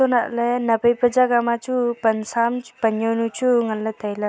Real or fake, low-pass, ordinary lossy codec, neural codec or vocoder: real; none; none; none